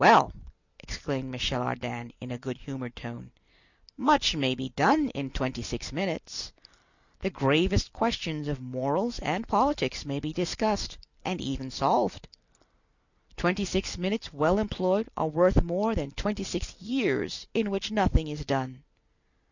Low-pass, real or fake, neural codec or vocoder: 7.2 kHz; real; none